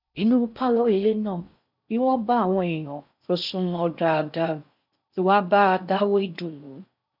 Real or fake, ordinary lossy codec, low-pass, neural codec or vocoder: fake; none; 5.4 kHz; codec, 16 kHz in and 24 kHz out, 0.6 kbps, FocalCodec, streaming, 4096 codes